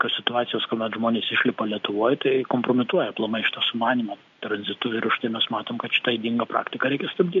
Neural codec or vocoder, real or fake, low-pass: none; real; 7.2 kHz